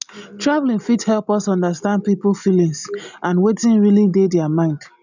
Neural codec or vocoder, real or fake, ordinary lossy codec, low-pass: none; real; none; 7.2 kHz